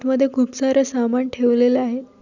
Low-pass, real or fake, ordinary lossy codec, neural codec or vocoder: 7.2 kHz; real; none; none